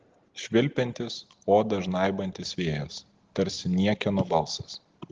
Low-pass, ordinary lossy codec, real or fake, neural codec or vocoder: 7.2 kHz; Opus, 16 kbps; real; none